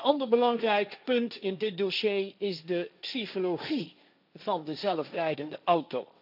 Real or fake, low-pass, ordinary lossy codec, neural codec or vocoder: fake; 5.4 kHz; none; codec, 16 kHz, 1.1 kbps, Voila-Tokenizer